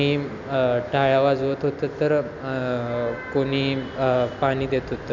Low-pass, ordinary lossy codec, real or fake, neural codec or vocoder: 7.2 kHz; none; real; none